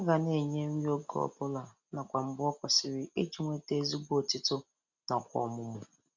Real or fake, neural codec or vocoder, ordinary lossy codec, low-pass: real; none; none; 7.2 kHz